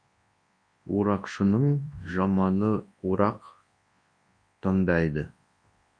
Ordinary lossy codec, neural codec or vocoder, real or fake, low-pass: MP3, 48 kbps; codec, 24 kHz, 0.9 kbps, WavTokenizer, large speech release; fake; 9.9 kHz